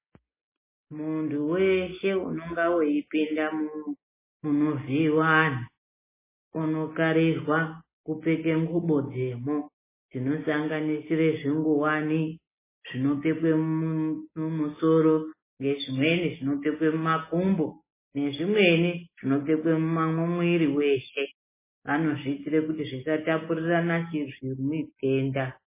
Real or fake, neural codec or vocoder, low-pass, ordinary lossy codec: real; none; 3.6 kHz; MP3, 16 kbps